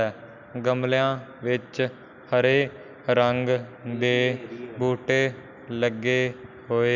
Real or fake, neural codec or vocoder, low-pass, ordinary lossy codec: real; none; 7.2 kHz; none